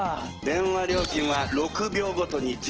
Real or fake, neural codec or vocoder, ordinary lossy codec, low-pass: real; none; Opus, 16 kbps; 7.2 kHz